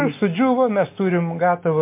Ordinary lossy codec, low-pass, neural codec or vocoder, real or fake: MP3, 24 kbps; 3.6 kHz; none; real